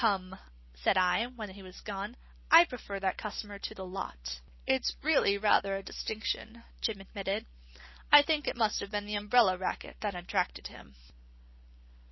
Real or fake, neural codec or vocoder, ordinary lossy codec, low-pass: real; none; MP3, 24 kbps; 7.2 kHz